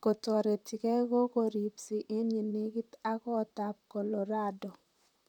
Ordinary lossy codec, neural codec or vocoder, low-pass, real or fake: none; vocoder, 44.1 kHz, 128 mel bands, Pupu-Vocoder; 19.8 kHz; fake